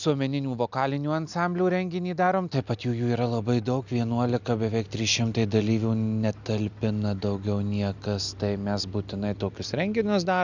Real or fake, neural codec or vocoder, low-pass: real; none; 7.2 kHz